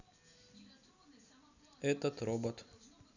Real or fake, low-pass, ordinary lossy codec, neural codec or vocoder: real; 7.2 kHz; none; none